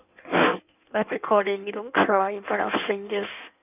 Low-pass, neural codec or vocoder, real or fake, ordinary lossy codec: 3.6 kHz; codec, 16 kHz in and 24 kHz out, 1.1 kbps, FireRedTTS-2 codec; fake; none